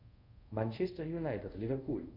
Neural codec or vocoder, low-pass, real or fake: codec, 24 kHz, 0.5 kbps, DualCodec; 5.4 kHz; fake